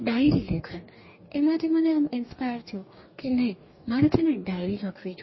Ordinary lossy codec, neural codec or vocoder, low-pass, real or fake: MP3, 24 kbps; codec, 44.1 kHz, 2.6 kbps, DAC; 7.2 kHz; fake